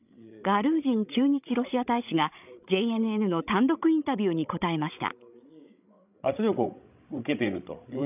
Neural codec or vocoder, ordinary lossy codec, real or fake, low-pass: codec, 16 kHz, 16 kbps, FreqCodec, larger model; none; fake; 3.6 kHz